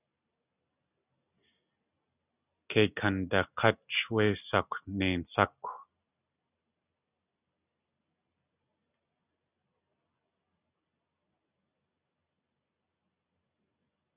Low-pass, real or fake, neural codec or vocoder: 3.6 kHz; real; none